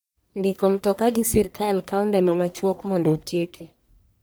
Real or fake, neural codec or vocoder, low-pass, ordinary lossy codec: fake; codec, 44.1 kHz, 1.7 kbps, Pupu-Codec; none; none